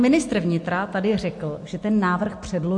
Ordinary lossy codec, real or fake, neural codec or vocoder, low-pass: MP3, 48 kbps; real; none; 10.8 kHz